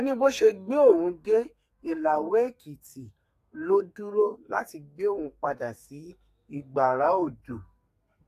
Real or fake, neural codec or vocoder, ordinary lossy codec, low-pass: fake; codec, 32 kHz, 1.9 kbps, SNAC; AAC, 64 kbps; 14.4 kHz